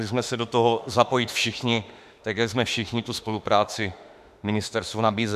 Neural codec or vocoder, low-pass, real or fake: autoencoder, 48 kHz, 32 numbers a frame, DAC-VAE, trained on Japanese speech; 14.4 kHz; fake